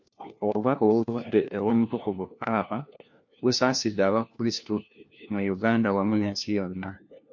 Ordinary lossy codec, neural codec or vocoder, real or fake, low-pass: MP3, 48 kbps; codec, 16 kHz, 1 kbps, FunCodec, trained on LibriTTS, 50 frames a second; fake; 7.2 kHz